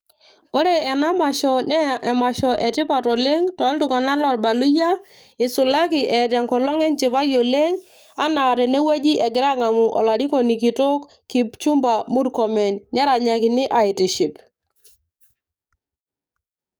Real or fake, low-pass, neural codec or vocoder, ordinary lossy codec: fake; none; codec, 44.1 kHz, 7.8 kbps, DAC; none